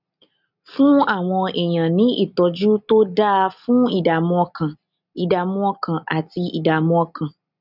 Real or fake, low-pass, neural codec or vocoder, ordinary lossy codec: real; 5.4 kHz; none; none